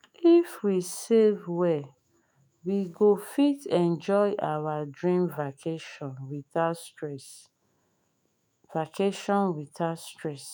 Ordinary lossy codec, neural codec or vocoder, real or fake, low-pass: none; autoencoder, 48 kHz, 128 numbers a frame, DAC-VAE, trained on Japanese speech; fake; none